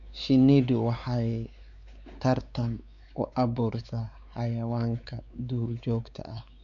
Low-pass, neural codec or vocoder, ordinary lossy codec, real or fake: 7.2 kHz; codec, 16 kHz, 4 kbps, X-Codec, WavLM features, trained on Multilingual LibriSpeech; none; fake